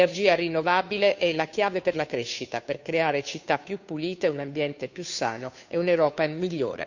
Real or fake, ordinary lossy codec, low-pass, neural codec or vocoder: fake; none; 7.2 kHz; codec, 16 kHz, 2 kbps, FunCodec, trained on Chinese and English, 25 frames a second